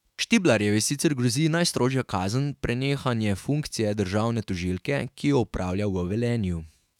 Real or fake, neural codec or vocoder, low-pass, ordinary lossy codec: fake; autoencoder, 48 kHz, 128 numbers a frame, DAC-VAE, trained on Japanese speech; 19.8 kHz; none